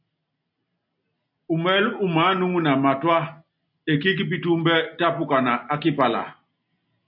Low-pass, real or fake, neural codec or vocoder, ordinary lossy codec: 5.4 kHz; real; none; AAC, 48 kbps